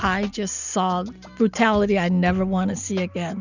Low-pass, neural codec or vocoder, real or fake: 7.2 kHz; none; real